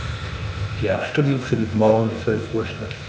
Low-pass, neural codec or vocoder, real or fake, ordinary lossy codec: none; codec, 16 kHz, 0.8 kbps, ZipCodec; fake; none